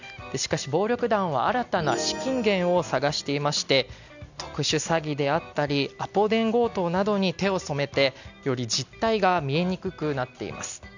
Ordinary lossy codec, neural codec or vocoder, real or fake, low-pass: none; none; real; 7.2 kHz